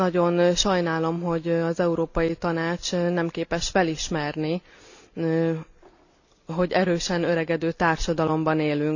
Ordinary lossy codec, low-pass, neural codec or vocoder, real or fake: none; 7.2 kHz; none; real